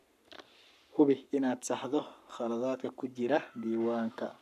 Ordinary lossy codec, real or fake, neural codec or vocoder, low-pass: none; fake; codec, 44.1 kHz, 7.8 kbps, Pupu-Codec; 14.4 kHz